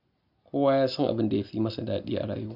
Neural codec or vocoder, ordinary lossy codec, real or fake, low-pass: none; none; real; 5.4 kHz